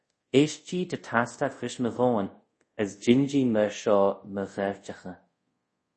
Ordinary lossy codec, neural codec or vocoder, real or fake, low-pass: MP3, 32 kbps; codec, 24 kHz, 0.5 kbps, DualCodec; fake; 10.8 kHz